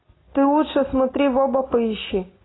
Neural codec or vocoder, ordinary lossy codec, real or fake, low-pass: none; AAC, 16 kbps; real; 7.2 kHz